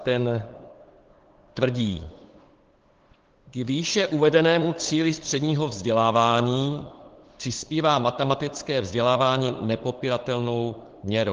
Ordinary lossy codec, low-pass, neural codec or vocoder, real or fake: Opus, 16 kbps; 7.2 kHz; codec, 16 kHz, 8 kbps, FunCodec, trained on LibriTTS, 25 frames a second; fake